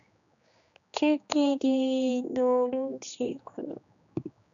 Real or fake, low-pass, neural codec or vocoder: fake; 7.2 kHz; codec, 16 kHz, 2 kbps, X-Codec, HuBERT features, trained on general audio